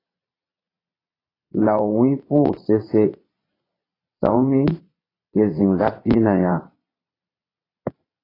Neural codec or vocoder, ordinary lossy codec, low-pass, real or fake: vocoder, 22.05 kHz, 80 mel bands, Vocos; AAC, 24 kbps; 5.4 kHz; fake